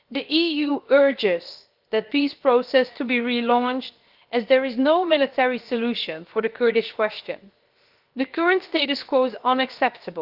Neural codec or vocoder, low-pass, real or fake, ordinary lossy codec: codec, 16 kHz, 0.7 kbps, FocalCodec; 5.4 kHz; fake; Opus, 32 kbps